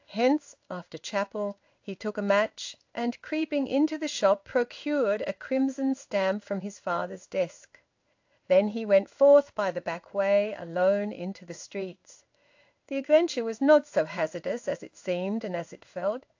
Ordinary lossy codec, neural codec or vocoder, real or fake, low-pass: AAC, 48 kbps; codec, 16 kHz in and 24 kHz out, 1 kbps, XY-Tokenizer; fake; 7.2 kHz